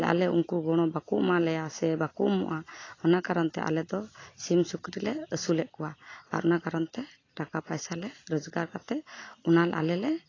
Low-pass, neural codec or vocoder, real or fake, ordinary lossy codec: 7.2 kHz; none; real; AAC, 32 kbps